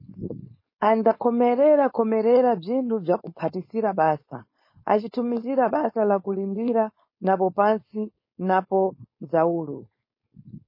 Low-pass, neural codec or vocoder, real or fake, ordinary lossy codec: 5.4 kHz; codec, 16 kHz, 4.8 kbps, FACodec; fake; MP3, 24 kbps